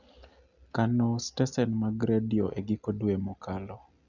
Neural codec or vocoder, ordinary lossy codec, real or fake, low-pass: none; none; real; 7.2 kHz